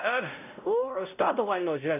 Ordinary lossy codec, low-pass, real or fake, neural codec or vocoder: none; 3.6 kHz; fake; codec, 16 kHz, 0.5 kbps, X-Codec, WavLM features, trained on Multilingual LibriSpeech